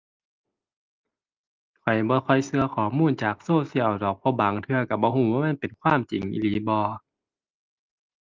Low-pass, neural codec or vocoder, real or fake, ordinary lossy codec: 7.2 kHz; none; real; Opus, 24 kbps